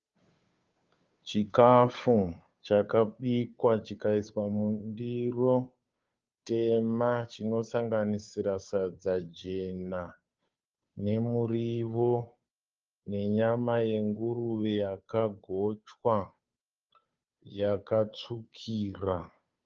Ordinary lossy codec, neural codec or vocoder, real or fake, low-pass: Opus, 24 kbps; codec, 16 kHz, 2 kbps, FunCodec, trained on Chinese and English, 25 frames a second; fake; 7.2 kHz